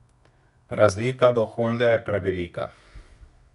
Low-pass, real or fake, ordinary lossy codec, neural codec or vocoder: 10.8 kHz; fake; none; codec, 24 kHz, 0.9 kbps, WavTokenizer, medium music audio release